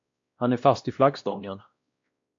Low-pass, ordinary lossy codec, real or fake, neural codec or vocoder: 7.2 kHz; AAC, 48 kbps; fake; codec, 16 kHz, 1 kbps, X-Codec, WavLM features, trained on Multilingual LibriSpeech